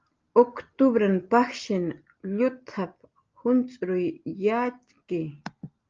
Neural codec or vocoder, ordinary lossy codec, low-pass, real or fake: none; Opus, 24 kbps; 7.2 kHz; real